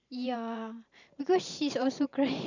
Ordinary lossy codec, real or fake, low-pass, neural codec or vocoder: none; fake; 7.2 kHz; vocoder, 44.1 kHz, 128 mel bands every 512 samples, BigVGAN v2